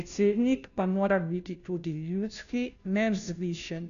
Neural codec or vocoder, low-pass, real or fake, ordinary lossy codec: codec, 16 kHz, 0.5 kbps, FunCodec, trained on Chinese and English, 25 frames a second; 7.2 kHz; fake; none